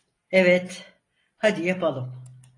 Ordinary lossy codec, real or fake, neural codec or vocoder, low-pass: AAC, 32 kbps; real; none; 10.8 kHz